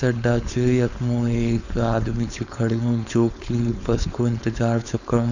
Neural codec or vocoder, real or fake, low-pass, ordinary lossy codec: codec, 16 kHz, 4.8 kbps, FACodec; fake; 7.2 kHz; none